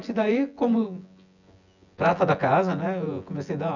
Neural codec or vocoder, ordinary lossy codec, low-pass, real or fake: vocoder, 24 kHz, 100 mel bands, Vocos; none; 7.2 kHz; fake